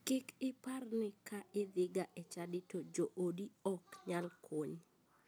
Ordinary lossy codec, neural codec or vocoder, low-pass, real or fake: none; none; none; real